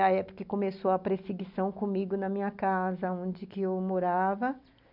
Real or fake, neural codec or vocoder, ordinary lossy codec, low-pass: real; none; none; 5.4 kHz